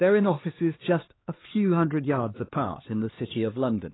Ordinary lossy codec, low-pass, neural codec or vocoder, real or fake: AAC, 16 kbps; 7.2 kHz; codec, 16 kHz, 2 kbps, X-Codec, HuBERT features, trained on LibriSpeech; fake